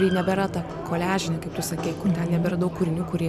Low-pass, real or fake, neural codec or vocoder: 14.4 kHz; real; none